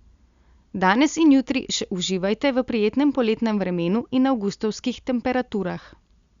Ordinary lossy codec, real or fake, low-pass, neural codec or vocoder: Opus, 64 kbps; real; 7.2 kHz; none